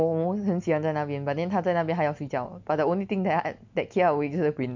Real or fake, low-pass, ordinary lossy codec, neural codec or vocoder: real; 7.2 kHz; MP3, 64 kbps; none